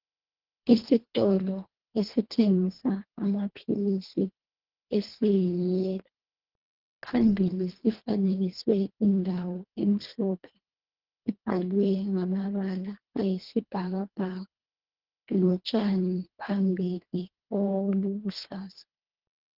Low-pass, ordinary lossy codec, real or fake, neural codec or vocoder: 5.4 kHz; Opus, 16 kbps; fake; codec, 24 kHz, 1.5 kbps, HILCodec